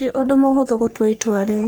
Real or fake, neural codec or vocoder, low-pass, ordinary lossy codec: fake; codec, 44.1 kHz, 3.4 kbps, Pupu-Codec; none; none